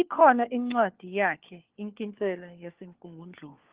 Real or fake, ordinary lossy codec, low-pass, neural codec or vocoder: fake; Opus, 16 kbps; 3.6 kHz; codec, 16 kHz, 4 kbps, FunCodec, trained on LibriTTS, 50 frames a second